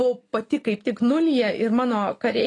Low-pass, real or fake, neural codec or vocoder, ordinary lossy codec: 10.8 kHz; real; none; AAC, 32 kbps